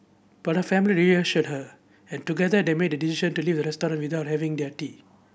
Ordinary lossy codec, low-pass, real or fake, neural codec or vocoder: none; none; real; none